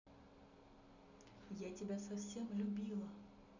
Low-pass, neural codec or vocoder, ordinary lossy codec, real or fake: 7.2 kHz; none; none; real